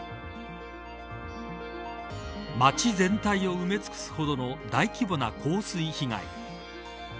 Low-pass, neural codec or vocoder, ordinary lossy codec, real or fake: none; none; none; real